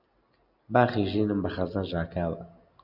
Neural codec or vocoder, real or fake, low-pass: none; real; 5.4 kHz